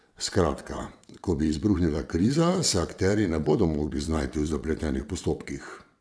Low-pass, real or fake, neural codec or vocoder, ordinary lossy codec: none; fake; vocoder, 22.05 kHz, 80 mel bands, WaveNeXt; none